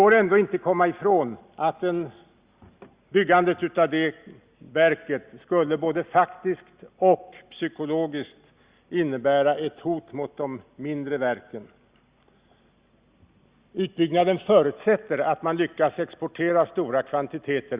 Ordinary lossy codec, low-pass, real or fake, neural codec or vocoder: Opus, 64 kbps; 3.6 kHz; real; none